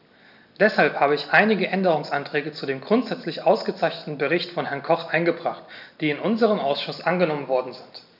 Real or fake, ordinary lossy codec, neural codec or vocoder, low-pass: real; none; none; 5.4 kHz